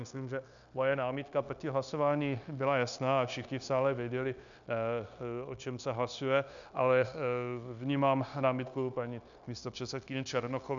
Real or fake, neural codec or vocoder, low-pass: fake; codec, 16 kHz, 0.9 kbps, LongCat-Audio-Codec; 7.2 kHz